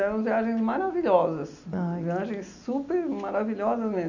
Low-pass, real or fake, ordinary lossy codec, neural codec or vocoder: 7.2 kHz; real; none; none